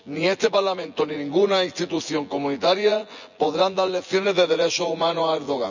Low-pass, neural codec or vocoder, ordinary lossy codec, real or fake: 7.2 kHz; vocoder, 24 kHz, 100 mel bands, Vocos; none; fake